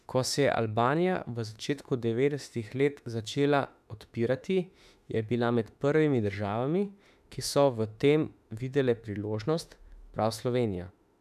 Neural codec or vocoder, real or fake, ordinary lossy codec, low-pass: autoencoder, 48 kHz, 32 numbers a frame, DAC-VAE, trained on Japanese speech; fake; none; 14.4 kHz